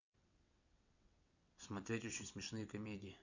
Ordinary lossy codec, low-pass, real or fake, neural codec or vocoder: none; 7.2 kHz; fake; vocoder, 22.05 kHz, 80 mel bands, WaveNeXt